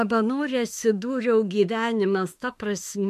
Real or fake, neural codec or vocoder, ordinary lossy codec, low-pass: fake; autoencoder, 48 kHz, 32 numbers a frame, DAC-VAE, trained on Japanese speech; MP3, 64 kbps; 14.4 kHz